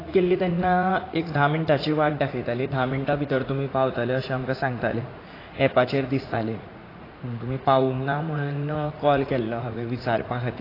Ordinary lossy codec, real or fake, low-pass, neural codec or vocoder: AAC, 24 kbps; fake; 5.4 kHz; vocoder, 44.1 kHz, 80 mel bands, Vocos